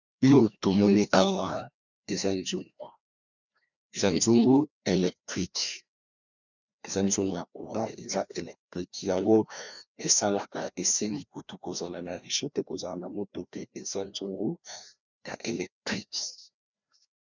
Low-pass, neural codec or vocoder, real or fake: 7.2 kHz; codec, 16 kHz, 1 kbps, FreqCodec, larger model; fake